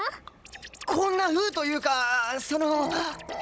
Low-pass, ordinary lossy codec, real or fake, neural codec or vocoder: none; none; fake; codec, 16 kHz, 16 kbps, FunCodec, trained on Chinese and English, 50 frames a second